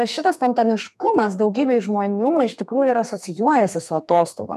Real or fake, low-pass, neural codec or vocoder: fake; 14.4 kHz; codec, 32 kHz, 1.9 kbps, SNAC